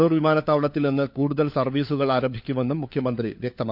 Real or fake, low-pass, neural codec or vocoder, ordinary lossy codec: fake; 5.4 kHz; codec, 16 kHz, 4 kbps, FunCodec, trained on LibriTTS, 50 frames a second; none